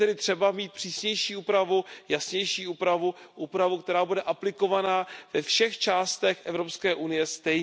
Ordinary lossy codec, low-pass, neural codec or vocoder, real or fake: none; none; none; real